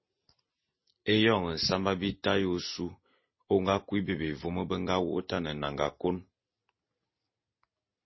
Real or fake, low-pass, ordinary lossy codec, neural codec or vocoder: real; 7.2 kHz; MP3, 24 kbps; none